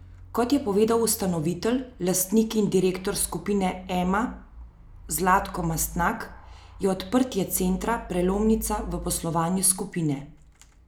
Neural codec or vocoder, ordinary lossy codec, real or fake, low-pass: none; none; real; none